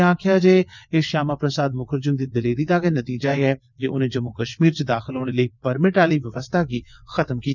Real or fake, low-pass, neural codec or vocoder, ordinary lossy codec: fake; 7.2 kHz; vocoder, 22.05 kHz, 80 mel bands, WaveNeXt; none